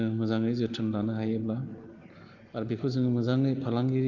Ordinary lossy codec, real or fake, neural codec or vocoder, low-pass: Opus, 16 kbps; real; none; 7.2 kHz